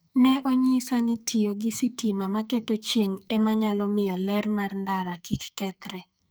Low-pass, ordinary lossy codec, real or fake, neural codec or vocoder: none; none; fake; codec, 44.1 kHz, 2.6 kbps, SNAC